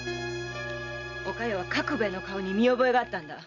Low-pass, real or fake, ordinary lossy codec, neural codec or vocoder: 7.2 kHz; real; none; none